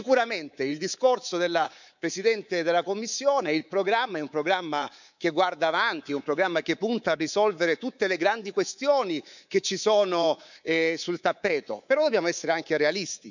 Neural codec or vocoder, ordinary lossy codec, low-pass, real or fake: codec, 24 kHz, 3.1 kbps, DualCodec; none; 7.2 kHz; fake